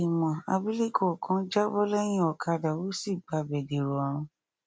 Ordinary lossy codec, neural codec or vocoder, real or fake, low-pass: none; none; real; none